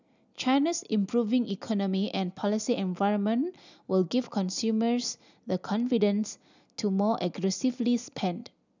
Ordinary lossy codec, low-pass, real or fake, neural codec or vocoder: none; 7.2 kHz; real; none